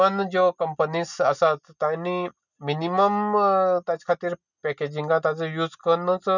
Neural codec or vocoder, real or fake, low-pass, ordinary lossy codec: none; real; 7.2 kHz; none